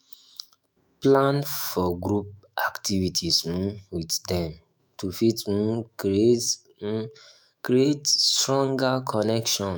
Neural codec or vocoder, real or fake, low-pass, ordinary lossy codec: autoencoder, 48 kHz, 128 numbers a frame, DAC-VAE, trained on Japanese speech; fake; none; none